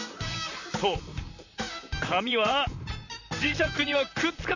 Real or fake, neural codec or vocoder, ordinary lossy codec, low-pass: fake; vocoder, 44.1 kHz, 128 mel bands, Pupu-Vocoder; none; 7.2 kHz